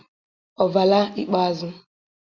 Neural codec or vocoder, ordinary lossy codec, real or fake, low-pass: none; Opus, 64 kbps; real; 7.2 kHz